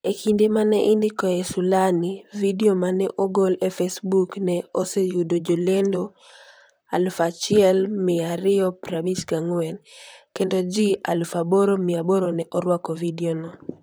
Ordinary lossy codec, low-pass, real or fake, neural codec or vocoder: none; none; fake; vocoder, 44.1 kHz, 128 mel bands, Pupu-Vocoder